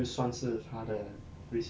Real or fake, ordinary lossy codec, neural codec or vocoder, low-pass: real; none; none; none